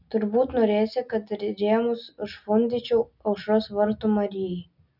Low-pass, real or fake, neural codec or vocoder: 5.4 kHz; real; none